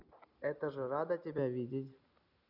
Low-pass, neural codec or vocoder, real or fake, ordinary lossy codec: 5.4 kHz; none; real; none